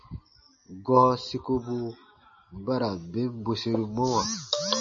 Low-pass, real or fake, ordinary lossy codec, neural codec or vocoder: 7.2 kHz; real; MP3, 32 kbps; none